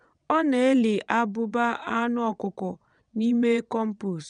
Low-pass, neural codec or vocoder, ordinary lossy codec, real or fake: 9.9 kHz; vocoder, 22.05 kHz, 80 mel bands, WaveNeXt; none; fake